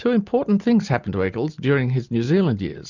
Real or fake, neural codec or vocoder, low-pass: real; none; 7.2 kHz